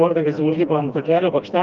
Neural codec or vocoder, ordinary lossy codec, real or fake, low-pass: codec, 16 kHz, 1 kbps, FreqCodec, smaller model; Opus, 32 kbps; fake; 7.2 kHz